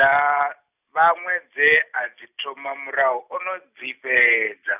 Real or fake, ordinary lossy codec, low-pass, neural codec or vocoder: real; none; 3.6 kHz; none